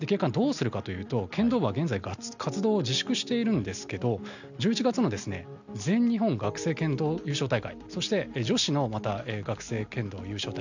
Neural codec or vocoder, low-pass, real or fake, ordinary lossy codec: none; 7.2 kHz; real; none